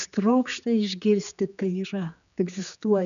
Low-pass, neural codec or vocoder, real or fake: 7.2 kHz; codec, 16 kHz, 4 kbps, X-Codec, HuBERT features, trained on general audio; fake